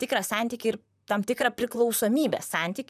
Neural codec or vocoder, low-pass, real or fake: vocoder, 44.1 kHz, 128 mel bands, Pupu-Vocoder; 14.4 kHz; fake